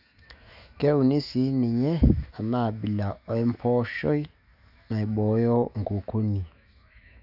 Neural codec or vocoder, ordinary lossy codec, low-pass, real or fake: autoencoder, 48 kHz, 128 numbers a frame, DAC-VAE, trained on Japanese speech; none; 5.4 kHz; fake